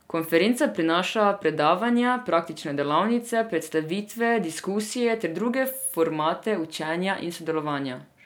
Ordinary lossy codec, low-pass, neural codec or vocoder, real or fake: none; none; none; real